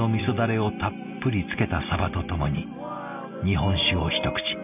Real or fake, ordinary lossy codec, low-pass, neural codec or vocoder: real; none; 3.6 kHz; none